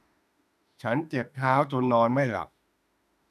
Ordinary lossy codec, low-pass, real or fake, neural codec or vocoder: none; 14.4 kHz; fake; autoencoder, 48 kHz, 32 numbers a frame, DAC-VAE, trained on Japanese speech